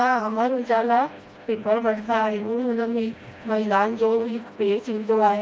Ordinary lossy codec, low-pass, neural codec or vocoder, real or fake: none; none; codec, 16 kHz, 1 kbps, FreqCodec, smaller model; fake